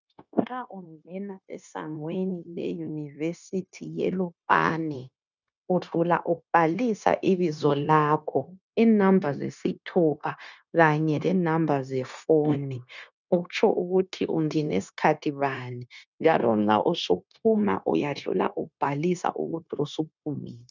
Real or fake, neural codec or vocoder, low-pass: fake; codec, 16 kHz, 0.9 kbps, LongCat-Audio-Codec; 7.2 kHz